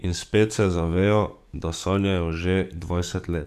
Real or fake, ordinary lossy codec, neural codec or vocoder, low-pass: fake; none; codec, 44.1 kHz, 7.8 kbps, DAC; 14.4 kHz